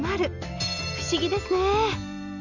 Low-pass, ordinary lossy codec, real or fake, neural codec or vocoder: 7.2 kHz; none; real; none